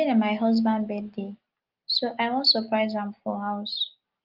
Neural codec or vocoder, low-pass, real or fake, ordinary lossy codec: none; 5.4 kHz; real; Opus, 32 kbps